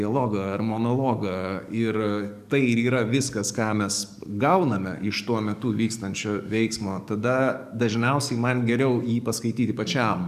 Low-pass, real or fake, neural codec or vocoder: 14.4 kHz; fake; codec, 44.1 kHz, 7.8 kbps, DAC